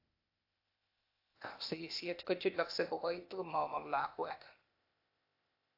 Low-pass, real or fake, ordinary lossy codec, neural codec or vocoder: 5.4 kHz; fake; AAC, 32 kbps; codec, 16 kHz, 0.8 kbps, ZipCodec